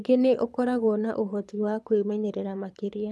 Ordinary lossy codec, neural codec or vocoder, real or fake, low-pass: none; codec, 24 kHz, 6 kbps, HILCodec; fake; none